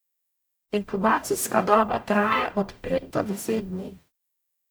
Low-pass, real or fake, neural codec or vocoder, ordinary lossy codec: none; fake; codec, 44.1 kHz, 0.9 kbps, DAC; none